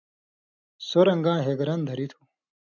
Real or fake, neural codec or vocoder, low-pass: real; none; 7.2 kHz